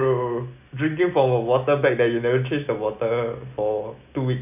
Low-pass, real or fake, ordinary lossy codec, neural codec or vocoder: 3.6 kHz; real; none; none